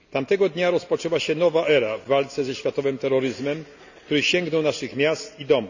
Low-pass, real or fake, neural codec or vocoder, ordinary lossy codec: 7.2 kHz; real; none; none